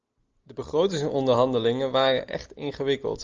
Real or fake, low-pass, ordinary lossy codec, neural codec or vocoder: real; 7.2 kHz; Opus, 24 kbps; none